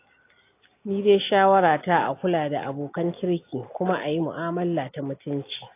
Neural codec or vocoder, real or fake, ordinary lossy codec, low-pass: none; real; AAC, 24 kbps; 3.6 kHz